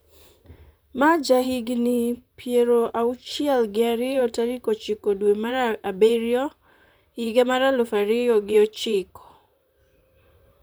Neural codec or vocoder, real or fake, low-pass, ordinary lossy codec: vocoder, 44.1 kHz, 128 mel bands, Pupu-Vocoder; fake; none; none